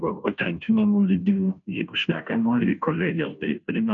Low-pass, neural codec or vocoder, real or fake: 7.2 kHz; codec, 16 kHz, 0.5 kbps, FunCodec, trained on Chinese and English, 25 frames a second; fake